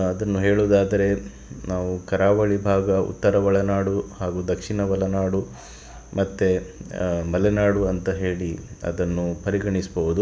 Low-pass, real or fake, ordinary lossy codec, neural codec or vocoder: none; real; none; none